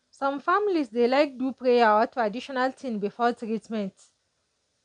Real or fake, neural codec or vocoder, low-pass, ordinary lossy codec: real; none; 9.9 kHz; none